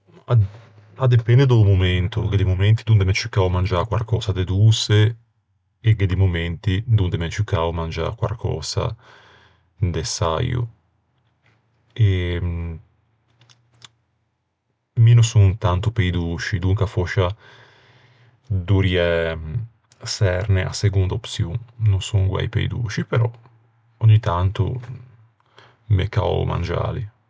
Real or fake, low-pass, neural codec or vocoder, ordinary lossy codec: real; none; none; none